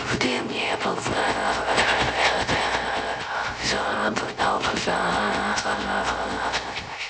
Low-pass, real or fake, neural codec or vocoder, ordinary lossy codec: none; fake; codec, 16 kHz, 0.3 kbps, FocalCodec; none